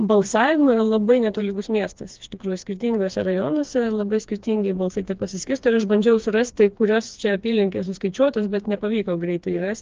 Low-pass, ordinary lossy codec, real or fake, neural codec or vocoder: 7.2 kHz; Opus, 24 kbps; fake; codec, 16 kHz, 2 kbps, FreqCodec, smaller model